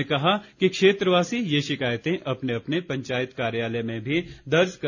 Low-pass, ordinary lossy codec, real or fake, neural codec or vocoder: 7.2 kHz; none; real; none